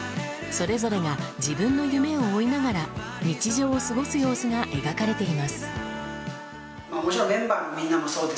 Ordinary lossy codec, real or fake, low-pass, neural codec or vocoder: none; real; none; none